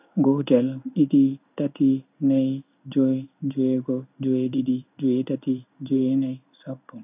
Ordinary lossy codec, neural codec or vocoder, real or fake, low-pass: none; codec, 16 kHz in and 24 kHz out, 1 kbps, XY-Tokenizer; fake; 3.6 kHz